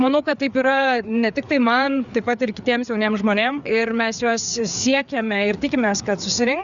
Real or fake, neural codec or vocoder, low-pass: fake; codec, 16 kHz, 4 kbps, FreqCodec, larger model; 7.2 kHz